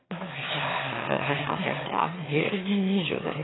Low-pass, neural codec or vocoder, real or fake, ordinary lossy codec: 7.2 kHz; autoencoder, 22.05 kHz, a latent of 192 numbers a frame, VITS, trained on one speaker; fake; AAC, 16 kbps